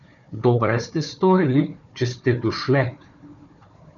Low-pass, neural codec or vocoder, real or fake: 7.2 kHz; codec, 16 kHz, 4 kbps, FunCodec, trained on Chinese and English, 50 frames a second; fake